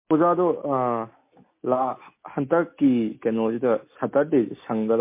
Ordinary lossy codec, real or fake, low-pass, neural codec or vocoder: MP3, 24 kbps; real; 3.6 kHz; none